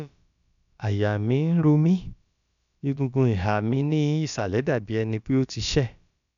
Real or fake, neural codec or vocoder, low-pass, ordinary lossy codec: fake; codec, 16 kHz, about 1 kbps, DyCAST, with the encoder's durations; 7.2 kHz; none